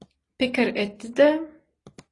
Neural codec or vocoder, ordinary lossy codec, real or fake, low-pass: none; AAC, 32 kbps; real; 10.8 kHz